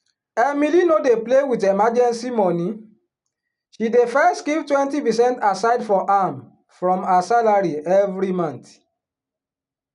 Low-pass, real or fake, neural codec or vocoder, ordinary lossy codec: 10.8 kHz; real; none; none